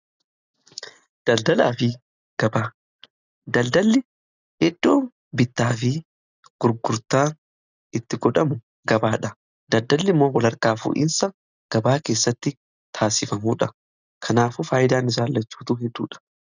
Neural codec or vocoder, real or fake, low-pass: none; real; 7.2 kHz